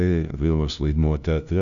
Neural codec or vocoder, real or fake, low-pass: codec, 16 kHz, 0.5 kbps, FunCodec, trained on LibriTTS, 25 frames a second; fake; 7.2 kHz